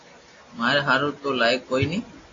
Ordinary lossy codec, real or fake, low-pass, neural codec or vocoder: AAC, 32 kbps; real; 7.2 kHz; none